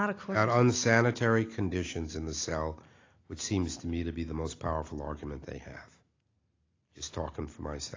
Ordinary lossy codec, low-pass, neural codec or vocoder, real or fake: AAC, 32 kbps; 7.2 kHz; none; real